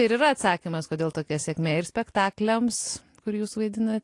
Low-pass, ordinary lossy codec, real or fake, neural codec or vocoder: 10.8 kHz; AAC, 48 kbps; real; none